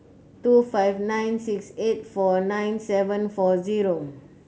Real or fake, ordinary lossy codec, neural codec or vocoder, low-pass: real; none; none; none